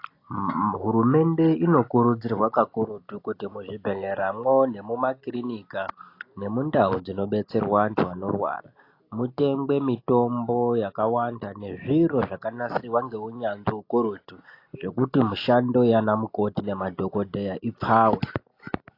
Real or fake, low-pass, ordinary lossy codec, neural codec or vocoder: real; 5.4 kHz; AAC, 32 kbps; none